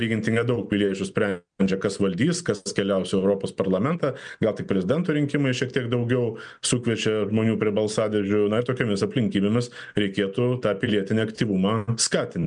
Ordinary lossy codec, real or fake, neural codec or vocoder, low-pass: MP3, 96 kbps; real; none; 9.9 kHz